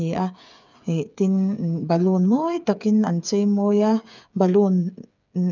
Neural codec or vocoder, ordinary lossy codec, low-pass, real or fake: codec, 16 kHz, 8 kbps, FreqCodec, smaller model; none; 7.2 kHz; fake